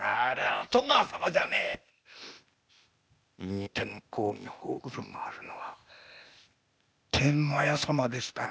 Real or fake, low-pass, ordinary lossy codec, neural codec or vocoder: fake; none; none; codec, 16 kHz, 0.8 kbps, ZipCodec